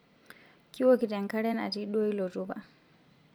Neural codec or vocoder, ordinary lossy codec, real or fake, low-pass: vocoder, 44.1 kHz, 128 mel bands every 256 samples, BigVGAN v2; none; fake; none